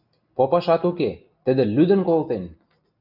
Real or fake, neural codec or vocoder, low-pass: real; none; 5.4 kHz